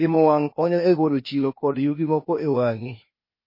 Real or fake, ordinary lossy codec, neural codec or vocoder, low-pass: fake; MP3, 24 kbps; codec, 16 kHz, 0.8 kbps, ZipCodec; 5.4 kHz